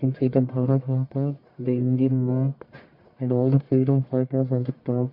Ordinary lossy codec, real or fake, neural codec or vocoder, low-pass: MP3, 32 kbps; fake; codec, 44.1 kHz, 1.7 kbps, Pupu-Codec; 5.4 kHz